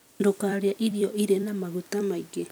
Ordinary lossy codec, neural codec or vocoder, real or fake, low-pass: none; vocoder, 44.1 kHz, 128 mel bands every 256 samples, BigVGAN v2; fake; none